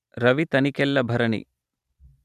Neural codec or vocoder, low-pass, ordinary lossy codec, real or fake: vocoder, 44.1 kHz, 128 mel bands every 512 samples, BigVGAN v2; 14.4 kHz; none; fake